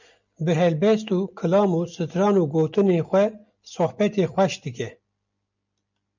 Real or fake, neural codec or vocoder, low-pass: real; none; 7.2 kHz